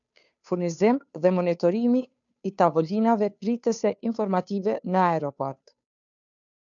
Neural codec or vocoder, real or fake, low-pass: codec, 16 kHz, 2 kbps, FunCodec, trained on Chinese and English, 25 frames a second; fake; 7.2 kHz